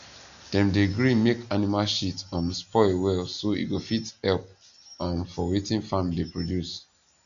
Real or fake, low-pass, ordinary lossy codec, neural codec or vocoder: real; 7.2 kHz; none; none